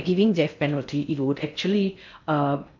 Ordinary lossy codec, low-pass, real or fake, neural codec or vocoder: MP3, 64 kbps; 7.2 kHz; fake; codec, 16 kHz in and 24 kHz out, 0.6 kbps, FocalCodec, streaming, 4096 codes